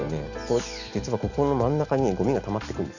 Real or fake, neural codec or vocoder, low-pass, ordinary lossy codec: real; none; 7.2 kHz; none